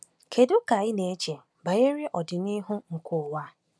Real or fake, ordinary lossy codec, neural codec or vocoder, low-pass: real; none; none; none